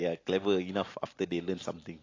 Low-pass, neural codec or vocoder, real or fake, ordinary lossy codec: 7.2 kHz; none; real; AAC, 32 kbps